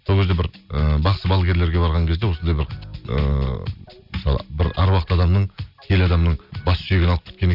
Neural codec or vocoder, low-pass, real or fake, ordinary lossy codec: none; 5.4 kHz; real; none